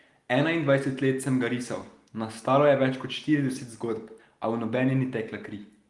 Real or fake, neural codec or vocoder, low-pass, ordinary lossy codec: real; none; 10.8 kHz; Opus, 24 kbps